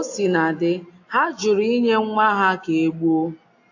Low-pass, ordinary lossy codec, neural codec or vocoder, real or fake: 7.2 kHz; AAC, 48 kbps; none; real